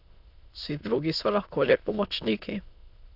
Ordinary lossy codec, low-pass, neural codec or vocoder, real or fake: none; 5.4 kHz; autoencoder, 22.05 kHz, a latent of 192 numbers a frame, VITS, trained on many speakers; fake